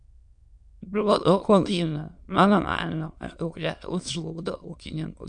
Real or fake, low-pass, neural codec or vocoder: fake; 9.9 kHz; autoencoder, 22.05 kHz, a latent of 192 numbers a frame, VITS, trained on many speakers